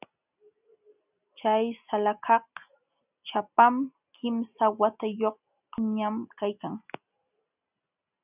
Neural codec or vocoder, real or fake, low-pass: none; real; 3.6 kHz